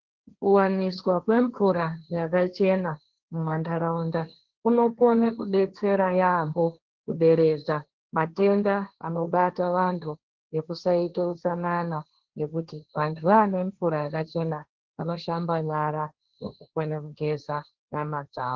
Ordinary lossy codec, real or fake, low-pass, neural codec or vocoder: Opus, 16 kbps; fake; 7.2 kHz; codec, 16 kHz, 1.1 kbps, Voila-Tokenizer